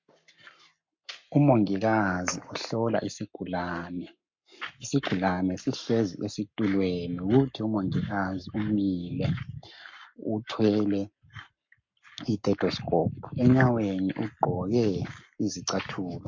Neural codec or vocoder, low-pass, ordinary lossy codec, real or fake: codec, 44.1 kHz, 7.8 kbps, Pupu-Codec; 7.2 kHz; MP3, 48 kbps; fake